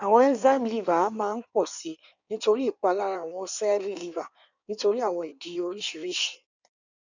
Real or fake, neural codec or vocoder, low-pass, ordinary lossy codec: fake; codec, 16 kHz in and 24 kHz out, 1.1 kbps, FireRedTTS-2 codec; 7.2 kHz; none